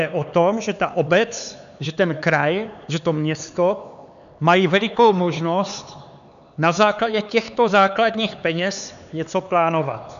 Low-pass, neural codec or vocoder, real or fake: 7.2 kHz; codec, 16 kHz, 4 kbps, X-Codec, HuBERT features, trained on LibriSpeech; fake